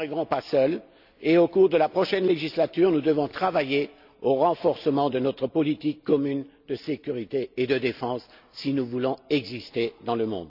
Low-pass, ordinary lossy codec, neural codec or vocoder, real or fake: 5.4 kHz; none; none; real